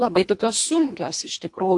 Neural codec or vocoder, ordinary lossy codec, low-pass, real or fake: codec, 24 kHz, 1.5 kbps, HILCodec; AAC, 64 kbps; 10.8 kHz; fake